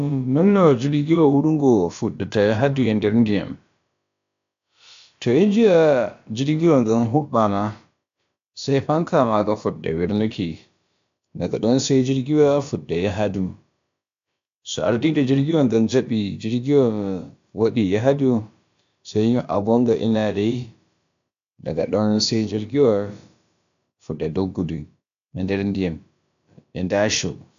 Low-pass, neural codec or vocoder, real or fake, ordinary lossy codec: 7.2 kHz; codec, 16 kHz, about 1 kbps, DyCAST, with the encoder's durations; fake; AAC, 64 kbps